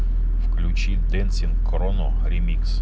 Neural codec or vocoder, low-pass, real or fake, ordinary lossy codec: none; none; real; none